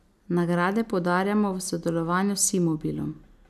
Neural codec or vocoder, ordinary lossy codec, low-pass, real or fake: none; none; 14.4 kHz; real